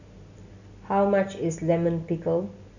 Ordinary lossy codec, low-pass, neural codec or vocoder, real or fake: none; 7.2 kHz; none; real